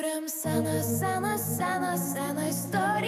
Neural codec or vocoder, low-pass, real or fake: autoencoder, 48 kHz, 128 numbers a frame, DAC-VAE, trained on Japanese speech; 14.4 kHz; fake